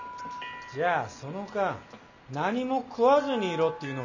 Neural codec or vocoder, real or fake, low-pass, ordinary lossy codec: none; real; 7.2 kHz; none